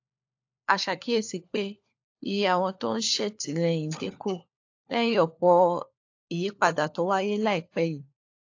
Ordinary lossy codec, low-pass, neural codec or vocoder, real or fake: AAC, 48 kbps; 7.2 kHz; codec, 16 kHz, 4 kbps, FunCodec, trained on LibriTTS, 50 frames a second; fake